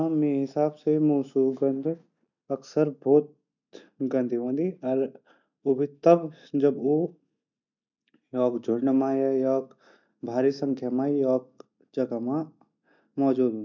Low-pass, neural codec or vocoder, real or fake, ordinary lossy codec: 7.2 kHz; none; real; none